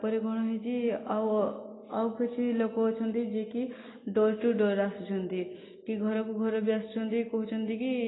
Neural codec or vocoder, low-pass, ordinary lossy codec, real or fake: none; 7.2 kHz; AAC, 16 kbps; real